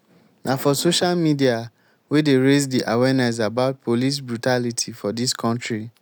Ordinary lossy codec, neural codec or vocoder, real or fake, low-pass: none; none; real; none